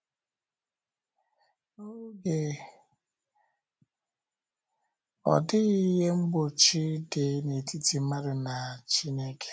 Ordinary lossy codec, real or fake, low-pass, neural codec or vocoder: none; real; none; none